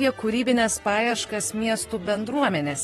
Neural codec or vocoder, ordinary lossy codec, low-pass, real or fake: vocoder, 44.1 kHz, 128 mel bands, Pupu-Vocoder; AAC, 32 kbps; 19.8 kHz; fake